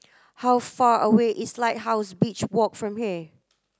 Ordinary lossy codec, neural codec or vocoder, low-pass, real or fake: none; none; none; real